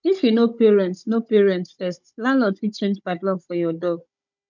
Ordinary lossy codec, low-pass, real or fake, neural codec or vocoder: none; 7.2 kHz; fake; codec, 16 kHz, 16 kbps, FunCodec, trained on Chinese and English, 50 frames a second